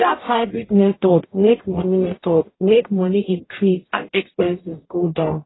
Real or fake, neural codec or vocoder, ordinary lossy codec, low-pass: fake; codec, 44.1 kHz, 0.9 kbps, DAC; AAC, 16 kbps; 7.2 kHz